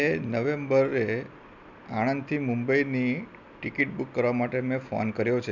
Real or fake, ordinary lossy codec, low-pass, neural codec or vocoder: real; none; 7.2 kHz; none